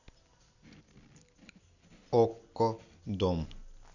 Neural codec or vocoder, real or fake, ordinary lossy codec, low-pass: none; real; none; 7.2 kHz